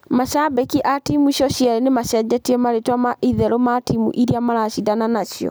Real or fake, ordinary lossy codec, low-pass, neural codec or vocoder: real; none; none; none